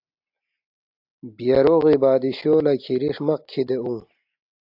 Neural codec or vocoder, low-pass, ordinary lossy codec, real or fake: none; 5.4 kHz; AAC, 48 kbps; real